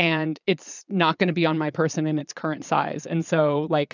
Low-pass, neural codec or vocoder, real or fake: 7.2 kHz; vocoder, 22.05 kHz, 80 mel bands, Vocos; fake